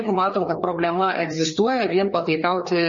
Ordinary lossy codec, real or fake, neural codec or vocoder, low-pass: MP3, 32 kbps; fake; codec, 16 kHz, 2 kbps, FreqCodec, larger model; 7.2 kHz